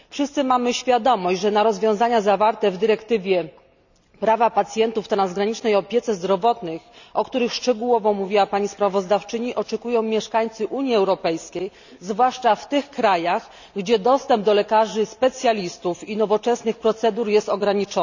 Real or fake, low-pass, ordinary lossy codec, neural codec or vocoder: real; 7.2 kHz; none; none